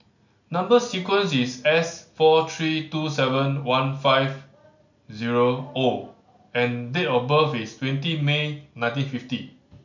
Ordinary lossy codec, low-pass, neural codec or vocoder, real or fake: none; 7.2 kHz; none; real